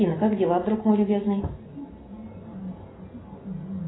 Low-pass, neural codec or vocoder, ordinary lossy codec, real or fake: 7.2 kHz; none; AAC, 16 kbps; real